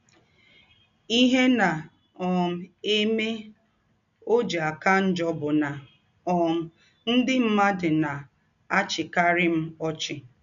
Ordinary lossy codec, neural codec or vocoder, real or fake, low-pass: none; none; real; 7.2 kHz